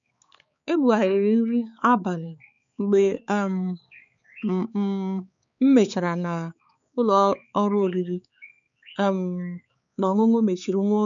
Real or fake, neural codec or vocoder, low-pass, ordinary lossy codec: fake; codec, 16 kHz, 4 kbps, X-Codec, HuBERT features, trained on balanced general audio; 7.2 kHz; none